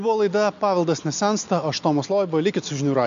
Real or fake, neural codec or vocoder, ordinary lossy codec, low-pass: real; none; MP3, 64 kbps; 7.2 kHz